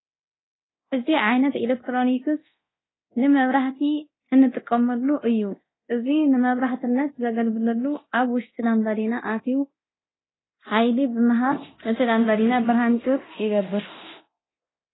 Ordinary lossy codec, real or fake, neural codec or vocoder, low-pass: AAC, 16 kbps; fake; codec, 24 kHz, 0.9 kbps, DualCodec; 7.2 kHz